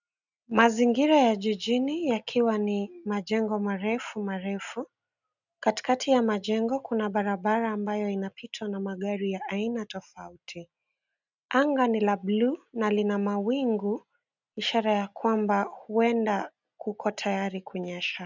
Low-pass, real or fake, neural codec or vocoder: 7.2 kHz; real; none